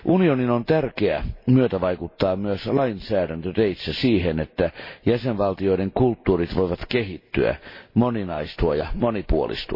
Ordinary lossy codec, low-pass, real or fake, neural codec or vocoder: MP3, 24 kbps; 5.4 kHz; real; none